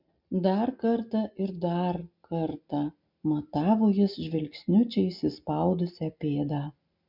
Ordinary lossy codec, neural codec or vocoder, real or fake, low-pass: MP3, 48 kbps; none; real; 5.4 kHz